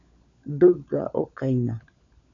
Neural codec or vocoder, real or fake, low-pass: codec, 16 kHz, 16 kbps, FunCodec, trained on LibriTTS, 50 frames a second; fake; 7.2 kHz